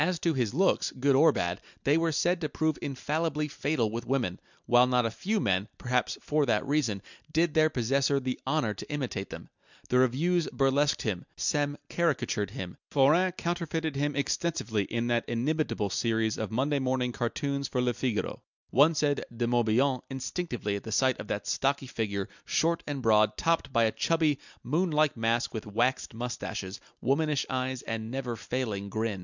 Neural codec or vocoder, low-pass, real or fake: none; 7.2 kHz; real